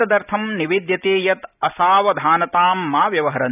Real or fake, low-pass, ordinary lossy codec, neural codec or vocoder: real; 3.6 kHz; none; none